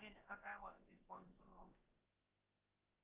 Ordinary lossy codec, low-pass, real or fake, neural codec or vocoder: Opus, 32 kbps; 3.6 kHz; fake; codec, 16 kHz, about 1 kbps, DyCAST, with the encoder's durations